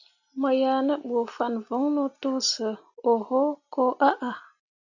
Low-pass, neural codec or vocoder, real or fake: 7.2 kHz; none; real